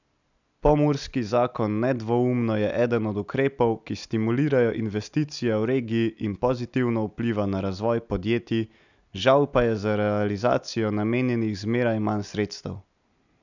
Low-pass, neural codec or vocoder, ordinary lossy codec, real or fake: 7.2 kHz; none; none; real